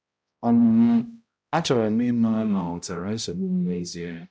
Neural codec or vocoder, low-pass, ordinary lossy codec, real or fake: codec, 16 kHz, 0.5 kbps, X-Codec, HuBERT features, trained on balanced general audio; none; none; fake